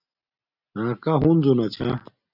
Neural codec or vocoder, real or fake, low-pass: none; real; 5.4 kHz